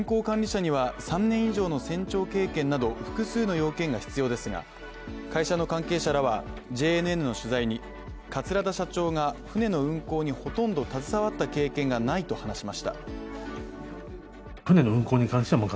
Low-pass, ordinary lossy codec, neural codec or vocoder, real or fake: none; none; none; real